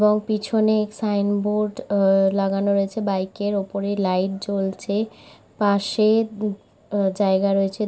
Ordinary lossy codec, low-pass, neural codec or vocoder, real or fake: none; none; none; real